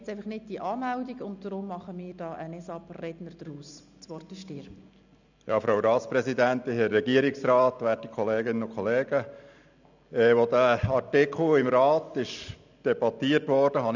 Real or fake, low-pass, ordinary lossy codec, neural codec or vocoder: real; 7.2 kHz; none; none